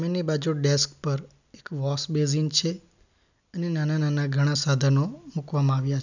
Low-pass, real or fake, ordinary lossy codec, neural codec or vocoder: 7.2 kHz; real; none; none